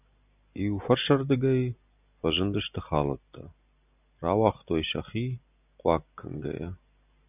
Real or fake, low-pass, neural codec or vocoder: real; 3.6 kHz; none